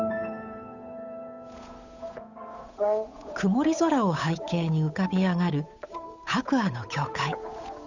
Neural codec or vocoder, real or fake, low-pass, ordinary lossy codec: codec, 16 kHz, 8 kbps, FunCodec, trained on Chinese and English, 25 frames a second; fake; 7.2 kHz; none